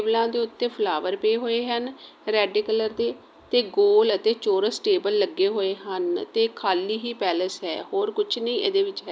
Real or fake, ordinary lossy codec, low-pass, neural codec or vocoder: real; none; none; none